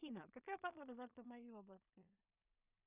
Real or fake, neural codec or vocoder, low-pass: fake; codec, 16 kHz in and 24 kHz out, 0.4 kbps, LongCat-Audio-Codec, two codebook decoder; 3.6 kHz